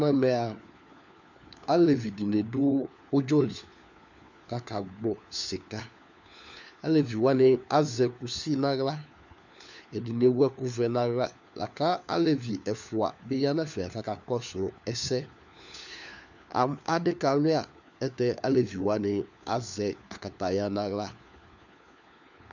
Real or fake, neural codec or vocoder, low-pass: fake; codec, 16 kHz, 4 kbps, FunCodec, trained on LibriTTS, 50 frames a second; 7.2 kHz